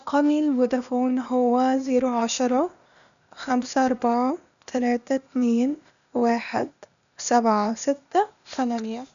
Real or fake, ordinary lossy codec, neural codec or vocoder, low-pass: fake; none; codec, 16 kHz, 0.8 kbps, ZipCodec; 7.2 kHz